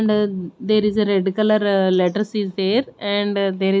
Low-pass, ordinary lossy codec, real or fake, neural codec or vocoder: none; none; real; none